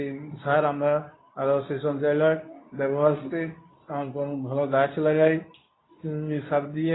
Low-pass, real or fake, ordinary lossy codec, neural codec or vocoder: 7.2 kHz; fake; AAC, 16 kbps; codec, 24 kHz, 0.9 kbps, WavTokenizer, medium speech release version 2